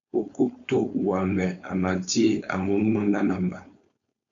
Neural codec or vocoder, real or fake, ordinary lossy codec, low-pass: codec, 16 kHz, 4.8 kbps, FACodec; fake; AAC, 64 kbps; 7.2 kHz